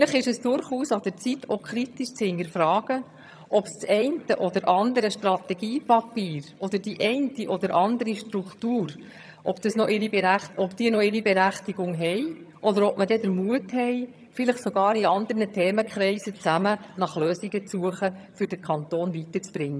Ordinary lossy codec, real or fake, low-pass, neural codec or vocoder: none; fake; none; vocoder, 22.05 kHz, 80 mel bands, HiFi-GAN